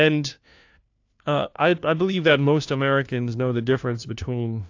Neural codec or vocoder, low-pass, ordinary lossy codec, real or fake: codec, 16 kHz, 1 kbps, FunCodec, trained on LibriTTS, 50 frames a second; 7.2 kHz; AAC, 48 kbps; fake